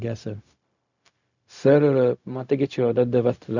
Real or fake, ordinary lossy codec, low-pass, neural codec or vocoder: fake; none; 7.2 kHz; codec, 16 kHz, 0.4 kbps, LongCat-Audio-Codec